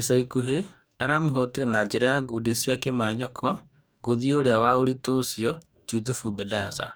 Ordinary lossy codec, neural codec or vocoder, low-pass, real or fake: none; codec, 44.1 kHz, 2.6 kbps, DAC; none; fake